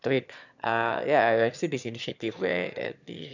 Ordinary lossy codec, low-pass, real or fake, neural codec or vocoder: none; 7.2 kHz; fake; autoencoder, 22.05 kHz, a latent of 192 numbers a frame, VITS, trained on one speaker